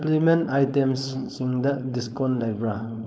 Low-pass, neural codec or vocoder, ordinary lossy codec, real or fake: none; codec, 16 kHz, 4.8 kbps, FACodec; none; fake